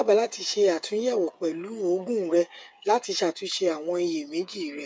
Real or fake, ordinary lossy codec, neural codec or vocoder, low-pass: fake; none; codec, 16 kHz, 8 kbps, FreqCodec, smaller model; none